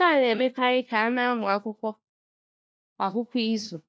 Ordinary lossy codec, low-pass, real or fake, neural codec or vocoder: none; none; fake; codec, 16 kHz, 1 kbps, FunCodec, trained on LibriTTS, 50 frames a second